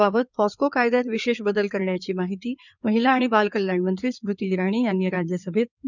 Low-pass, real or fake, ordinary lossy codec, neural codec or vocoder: 7.2 kHz; fake; none; codec, 16 kHz in and 24 kHz out, 2.2 kbps, FireRedTTS-2 codec